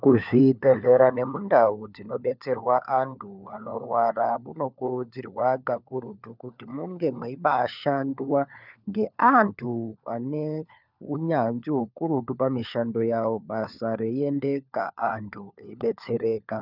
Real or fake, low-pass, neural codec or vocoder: fake; 5.4 kHz; codec, 16 kHz, 4 kbps, FunCodec, trained on LibriTTS, 50 frames a second